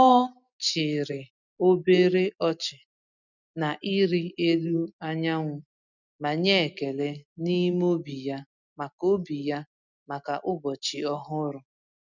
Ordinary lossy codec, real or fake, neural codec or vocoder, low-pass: none; real; none; 7.2 kHz